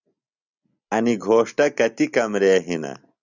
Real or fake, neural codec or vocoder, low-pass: real; none; 7.2 kHz